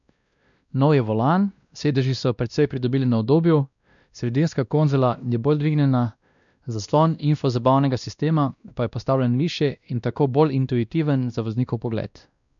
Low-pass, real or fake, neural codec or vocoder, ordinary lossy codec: 7.2 kHz; fake; codec, 16 kHz, 1 kbps, X-Codec, WavLM features, trained on Multilingual LibriSpeech; none